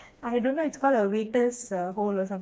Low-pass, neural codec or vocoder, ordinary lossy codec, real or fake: none; codec, 16 kHz, 2 kbps, FreqCodec, smaller model; none; fake